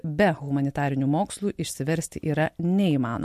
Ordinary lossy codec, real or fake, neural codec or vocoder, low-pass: MP3, 64 kbps; real; none; 14.4 kHz